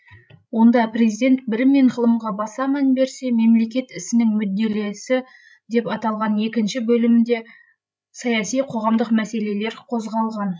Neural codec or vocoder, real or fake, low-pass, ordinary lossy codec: codec, 16 kHz, 16 kbps, FreqCodec, larger model; fake; none; none